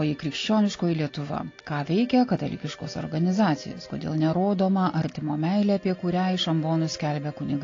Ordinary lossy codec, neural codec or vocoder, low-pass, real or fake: AAC, 32 kbps; none; 7.2 kHz; real